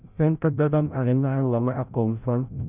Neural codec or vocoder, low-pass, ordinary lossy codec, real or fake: codec, 16 kHz, 0.5 kbps, FreqCodec, larger model; 3.6 kHz; none; fake